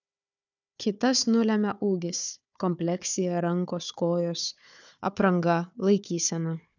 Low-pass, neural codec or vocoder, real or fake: 7.2 kHz; codec, 16 kHz, 4 kbps, FunCodec, trained on Chinese and English, 50 frames a second; fake